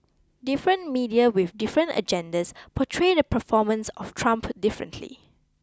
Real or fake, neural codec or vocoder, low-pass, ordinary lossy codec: real; none; none; none